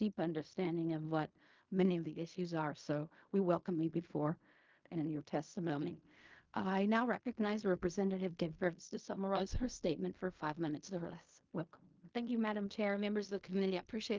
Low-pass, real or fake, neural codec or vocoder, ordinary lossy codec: 7.2 kHz; fake; codec, 16 kHz in and 24 kHz out, 0.4 kbps, LongCat-Audio-Codec, fine tuned four codebook decoder; Opus, 32 kbps